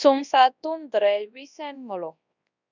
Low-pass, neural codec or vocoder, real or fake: 7.2 kHz; codec, 24 kHz, 0.9 kbps, WavTokenizer, large speech release; fake